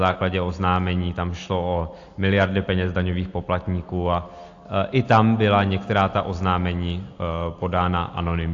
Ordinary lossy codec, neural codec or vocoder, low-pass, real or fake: AAC, 48 kbps; none; 7.2 kHz; real